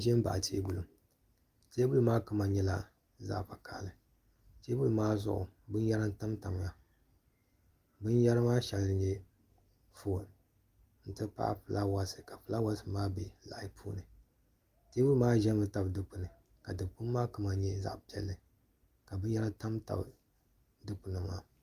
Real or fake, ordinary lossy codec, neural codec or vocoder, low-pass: real; Opus, 32 kbps; none; 14.4 kHz